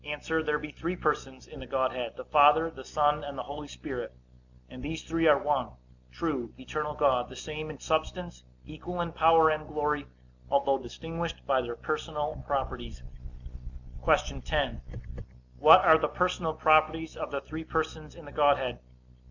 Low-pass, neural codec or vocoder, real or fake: 7.2 kHz; none; real